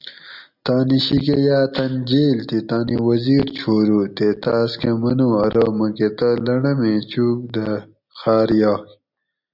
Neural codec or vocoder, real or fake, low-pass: none; real; 5.4 kHz